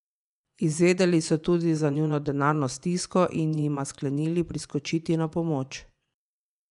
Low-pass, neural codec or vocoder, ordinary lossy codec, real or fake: 10.8 kHz; vocoder, 24 kHz, 100 mel bands, Vocos; none; fake